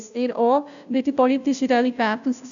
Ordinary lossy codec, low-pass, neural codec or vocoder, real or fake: none; 7.2 kHz; codec, 16 kHz, 0.5 kbps, FunCodec, trained on Chinese and English, 25 frames a second; fake